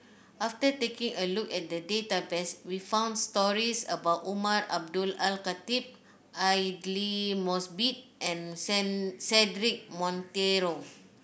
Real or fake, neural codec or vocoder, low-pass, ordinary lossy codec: real; none; none; none